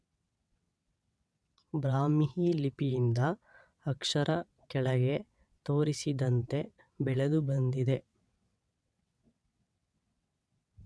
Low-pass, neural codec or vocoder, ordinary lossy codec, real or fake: none; vocoder, 22.05 kHz, 80 mel bands, WaveNeXt; none; fake